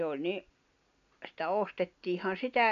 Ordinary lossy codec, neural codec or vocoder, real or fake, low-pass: none; none; real; 7.2 kHz